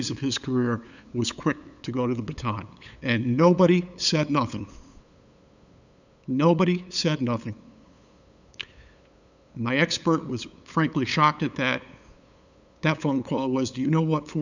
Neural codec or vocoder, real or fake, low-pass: codec, 16 kHz, 8 kbps, FunCodec, trained on LibriTTS, 25 frames a second; fake; 7.2 kHz